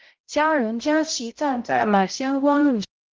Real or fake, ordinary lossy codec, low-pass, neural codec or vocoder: fake; Opus, 16 kbps; 7.2 kHz; codec, 16 kHz, 0.5 kbps, X-Codec, HuBERT features, trained on balanced general audio